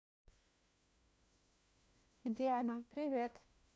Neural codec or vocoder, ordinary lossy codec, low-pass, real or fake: codec, 16 kHz, 1 kbps, FunCodec, trained on LibriTTS, 50 frames a second; none; none; fake